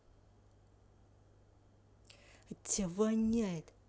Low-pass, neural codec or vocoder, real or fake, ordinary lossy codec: none; none; real; none